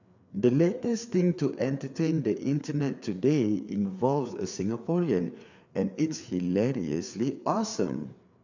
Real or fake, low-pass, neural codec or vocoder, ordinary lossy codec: fake; 7.2 kHz; codec, 16 kHz, 4 kbps, FreqCodec, larger model; none